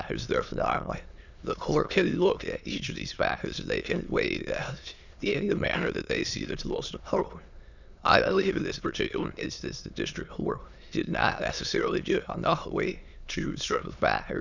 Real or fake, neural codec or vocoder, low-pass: fake; autoencoder, 22.05 kHz, a latent of 192 numbers a frame, VITS, trained on many speakers; 7.2 kHz